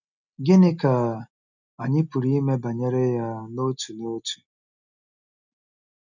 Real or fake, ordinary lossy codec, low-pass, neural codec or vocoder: real; none; 7.2 kHz; none